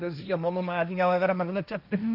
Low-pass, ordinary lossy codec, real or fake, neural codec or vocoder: 5.4 kHz; none; fake; codec, 16 kHz, 1.1 kbps, Voila-Tokenizer